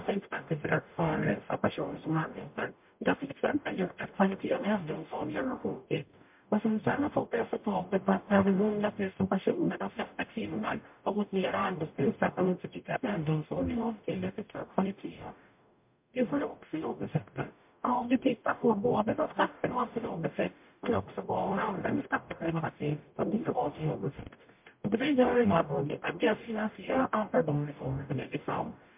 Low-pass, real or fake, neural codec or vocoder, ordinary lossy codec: 3.6 kHz; fake; codec, 44.1 kHz, 0.9 kbps, DAC; MP3, 32 kbps